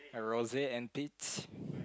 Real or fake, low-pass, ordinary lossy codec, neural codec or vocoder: real; none; none; none